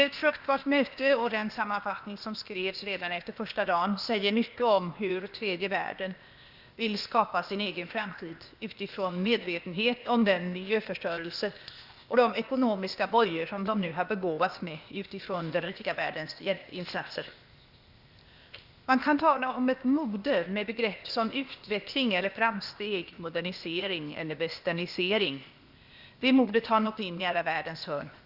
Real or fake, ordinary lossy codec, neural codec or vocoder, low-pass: fake; Opus, 64 kbps; codec, 16 kHz, 0.8 kbps, ZipCodec; 5.4 kHz